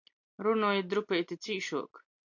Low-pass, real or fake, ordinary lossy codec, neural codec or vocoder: 7.2 kHz; real; AAC, 48 kbps; none